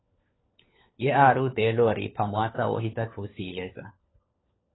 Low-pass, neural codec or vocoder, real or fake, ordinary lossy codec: 7.2 kHz; codec, 16 kHz, 4 kbps, FunCodec, trained on LibriTTS, 50 frames a second; fake; AAC, 16 kbps